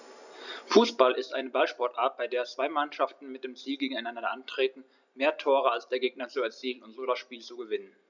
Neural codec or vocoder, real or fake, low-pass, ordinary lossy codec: none; real; 7.2 kHz; none